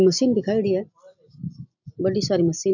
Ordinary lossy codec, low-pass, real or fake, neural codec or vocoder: none; 7.2 kHz; real; none